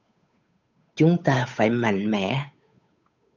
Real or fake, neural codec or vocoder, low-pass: fake; codec, 16 kHz, 8 kbps, FunCodec, trained on Chinese and English, 25 frames a second; 7.2 kHz